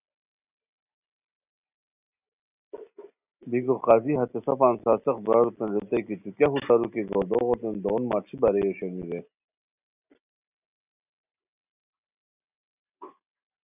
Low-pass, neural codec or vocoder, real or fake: 3.6 kHz; none; real